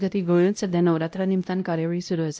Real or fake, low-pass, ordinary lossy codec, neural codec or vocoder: fake; none; none; codec, 16 kHz, 0.5 kbps, X-Codec, WavLM features, trained on Multilingual LibriSpeech